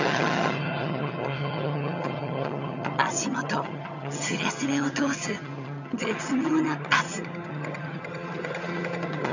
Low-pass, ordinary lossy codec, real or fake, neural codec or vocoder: 7.2 kHz; none; fake; vocoder, 22.05 kHz, 80 mel bands, HiFi-GAN